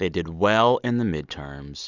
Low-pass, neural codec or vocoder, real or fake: 7.2 kHz; none; real